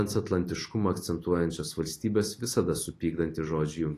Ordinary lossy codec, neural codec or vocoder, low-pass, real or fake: AAC, 48 kbps; none; 14.4 kHz; real